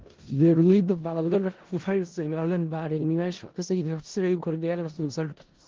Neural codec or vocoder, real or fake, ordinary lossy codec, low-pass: codec, 16 kHz in and 24 kHz out, 0.4 kbps, LongCat-Audio-Codec, four codebook decoder; fake; Opus, 16 kbps; 7.2 kHz